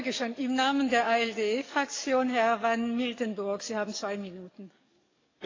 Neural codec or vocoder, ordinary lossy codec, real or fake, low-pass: codec, 44.1 kHz, 7.8 kbps, DAC; AAC, 32 kbps; fake; 7.2 kHz